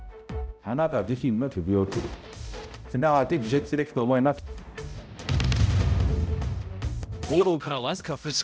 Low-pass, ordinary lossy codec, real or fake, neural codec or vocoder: none; none; fake; codec, 16 kHz, 0.5 kbps, X-Codec, HuBERT features, trained on balanced general audio